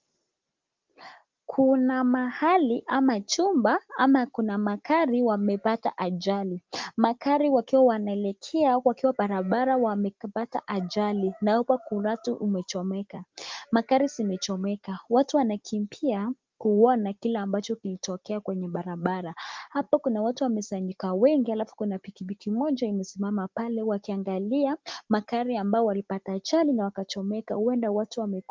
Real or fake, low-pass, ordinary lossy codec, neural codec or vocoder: real; 7.2 kHz; Opus, 32 kbps; none